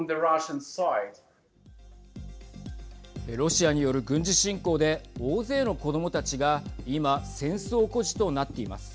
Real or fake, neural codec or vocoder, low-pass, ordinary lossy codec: real; none; none; none